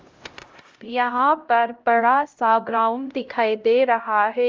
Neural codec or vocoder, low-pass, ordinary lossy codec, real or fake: codec, 16 kHz, 0.5 kbps, X-Codec, HuBERT features, trained on LibriSpeech; 7.2 kHz; Opus, 32 kbps; fake